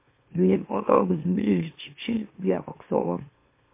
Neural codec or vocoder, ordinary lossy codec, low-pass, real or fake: autoencoder, 44.1 kHz, a latent of 192 numbers a frame, MeloTTS; MP3, 24 kbps; 3.6 kHz; fake